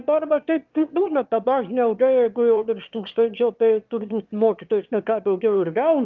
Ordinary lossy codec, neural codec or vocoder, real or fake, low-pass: Opus, 32 kbps; autoencoder, 22.05 kHz, a latent of 192 numbers a frame, VITS, trained on one speaker; fake; 7.2 kHz